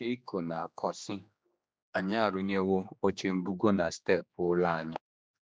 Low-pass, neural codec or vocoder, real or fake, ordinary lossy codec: none; codec, 16 kHz, 2 kbps, X-Codec, HuBERT features, trained on general audio; fake; none